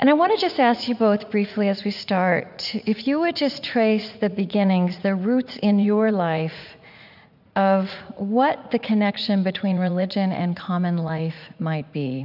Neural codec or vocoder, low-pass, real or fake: vocoder, 44.1 kHz, 80 mel bands, Vocos; 5.4 kHz; fake